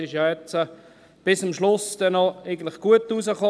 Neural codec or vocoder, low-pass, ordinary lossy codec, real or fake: none; none; none; real